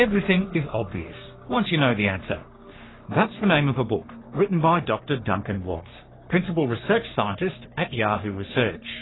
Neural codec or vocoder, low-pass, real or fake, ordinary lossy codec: codec, 44.1 kHz, 3.4 kbps, Pupu-Codec; 7.2 kHz; fake; AAC, 16 kbps